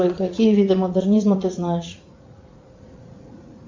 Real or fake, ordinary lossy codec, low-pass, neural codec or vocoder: fake; MP3, 64 kbps; 7.2 kHz; vocoder, 44.1 kHz, 80 mel bands, Vocos